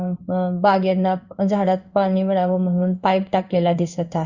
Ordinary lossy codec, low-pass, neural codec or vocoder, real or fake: Opus, 64 kbps; 7.2 kHz; codec, 16 kHz in and 24 kHz out, 1 kbps, XY-Tokenizer; fake